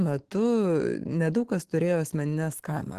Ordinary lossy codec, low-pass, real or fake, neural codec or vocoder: Opus, 16 kbps; 14.4 kHz; fake; autoencoder, 48 kHz, 128 numbers a frame, DAC-VAE, trained on Japanese speech